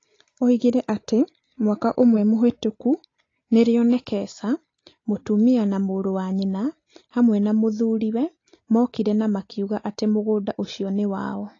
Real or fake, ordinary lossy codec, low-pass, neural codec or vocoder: real; AAC, 32 kbps; 7.2 kHz; none